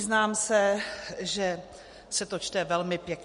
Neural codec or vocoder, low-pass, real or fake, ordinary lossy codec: none; 10.8 kHz; real; MP3, 48 kbps